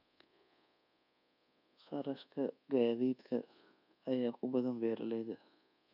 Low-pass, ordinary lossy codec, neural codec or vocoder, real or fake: 5.4 kHz; none; codec, 24 kHz, 1.2 kbps, DualCodec; fake